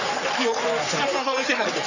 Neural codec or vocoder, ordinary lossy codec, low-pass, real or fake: codec, 44.1 kHz, 3.4 kbps, Pupu-Codec; none; 7.2 kHz; fake